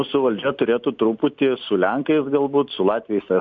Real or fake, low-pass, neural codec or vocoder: real; 7.2 kHz; none